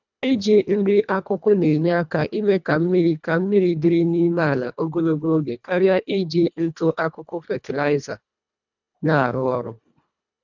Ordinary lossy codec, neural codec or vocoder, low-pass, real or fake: none; codec, 24 kHz, 1.5 kbps, HILCodec; 7.2 kHz; fake